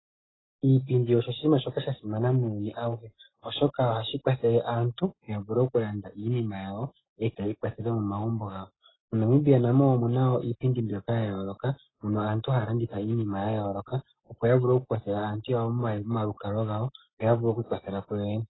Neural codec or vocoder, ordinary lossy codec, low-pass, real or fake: none; AAC, 16 kbps; 7.2 kHz; real